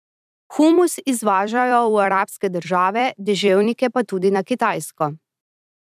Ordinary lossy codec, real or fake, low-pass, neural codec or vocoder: none; fake; 14.4 kHz; vocoder, 44.1 kHz, 128 mel bands every 256 samples, BigVGAN v2